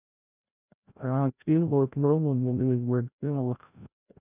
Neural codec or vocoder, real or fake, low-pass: codec, 16 kHz, 0.5 kbps, FreqCodec, larger model; fake; 3.6 kHz